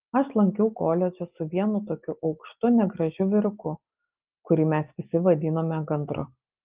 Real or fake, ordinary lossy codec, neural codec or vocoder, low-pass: real; Opus, 32 kbps; none; 3.6 kHz